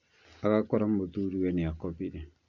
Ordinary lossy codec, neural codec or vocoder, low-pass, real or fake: none; none; 7.2 kHz; real